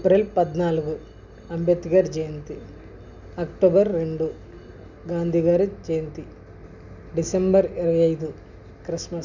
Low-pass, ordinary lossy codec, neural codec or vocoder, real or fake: 7.2 kHz; none; none; real